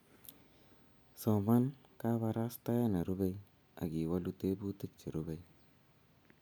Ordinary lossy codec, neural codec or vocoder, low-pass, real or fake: none; none; none; real